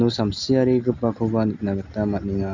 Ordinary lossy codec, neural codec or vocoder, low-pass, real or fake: none; none; 7.2 kHz; real